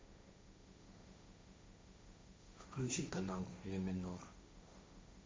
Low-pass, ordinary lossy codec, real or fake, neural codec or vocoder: none; none; fake; codec, 16 kHz, 1.1 kbps, Voila-Tokenizer